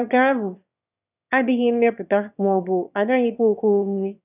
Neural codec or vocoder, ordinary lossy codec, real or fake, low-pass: autoencoder, 22.05 kHz, a latent of 192 numbers a frame, VITS, trained on one speaker; none; fake; 3.6 kHz